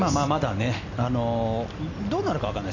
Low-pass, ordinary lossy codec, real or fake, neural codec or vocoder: 7.2 kHz; none; real; none